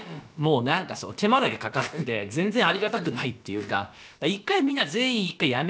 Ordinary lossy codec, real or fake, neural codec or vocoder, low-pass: none; fake; codec, 16 kHz, about 1 kbps, DyCAST, with the encoder's durations; none